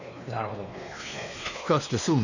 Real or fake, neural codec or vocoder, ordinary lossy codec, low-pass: fake; codec, 16 kHz, 2 kbps, X-Codec, WavLM features, trained on Multilingual LibriSpeech; none; 7.2 kHz